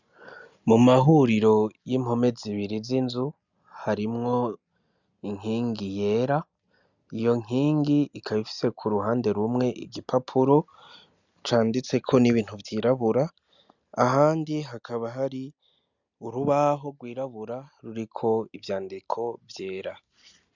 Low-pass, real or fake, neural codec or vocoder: 7.2 kHz; real; none